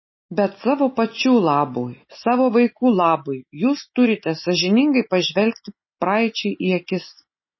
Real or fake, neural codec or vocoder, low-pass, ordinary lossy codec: real; none; 7.2 kHz; MP3, 24 kbps